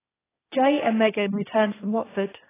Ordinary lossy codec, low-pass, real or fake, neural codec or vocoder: AAC, 16 kbps; 3.6 kHz; fake; codec, 16 kHz, 1.1 kbps, Voila-Tokenizer